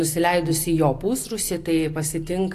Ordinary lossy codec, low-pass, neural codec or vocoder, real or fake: AAC, 64 kbps; 14.4 kHz; none; real